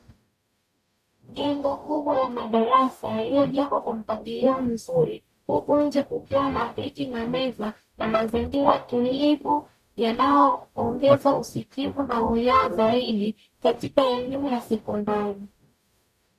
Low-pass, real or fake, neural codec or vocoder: 14.4 kHz; fake; codec, 44.1 kHz, 0.9 kbps, DAC